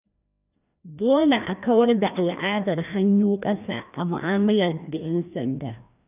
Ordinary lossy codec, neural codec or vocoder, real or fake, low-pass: none; codec, 16 kHz, 1 kbps, FreqCodec, larger model; fake; 3.6 kHz